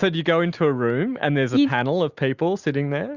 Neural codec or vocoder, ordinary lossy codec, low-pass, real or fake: none; Opus, 64 kbps; 7.2 kHz; real